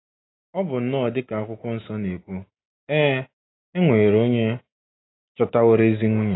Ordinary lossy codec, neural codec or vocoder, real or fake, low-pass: AAC, 16 kbps; none; real; 7.2 kHz